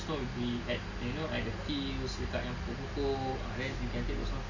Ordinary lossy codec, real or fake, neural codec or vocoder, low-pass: none; real; none; 7.2 kHz